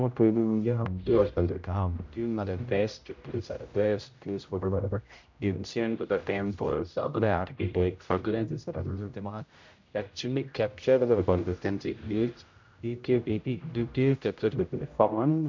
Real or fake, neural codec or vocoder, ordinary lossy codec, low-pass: fake; codec, 16 kHz, 0.5 kbps, X-Codec, HuBERT features, trained on general audio; MP3, 64 kbps; 7.2 kHz